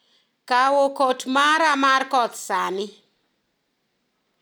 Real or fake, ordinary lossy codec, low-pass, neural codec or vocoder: real; none; none; none